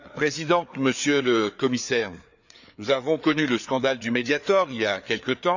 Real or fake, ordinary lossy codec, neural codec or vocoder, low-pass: fake; none; codec, 16 kHz, 4 kbps, FreqCodec, larger model; 7.2 kHz